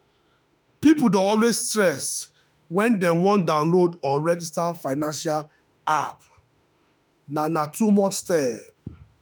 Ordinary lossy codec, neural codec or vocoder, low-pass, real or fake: none; autoencoder, 48 kHz, 32 numbers a frame, DAC-VAE, trained on Japanese speech; none; fake